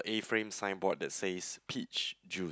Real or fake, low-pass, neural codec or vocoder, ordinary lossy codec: real; none; none; none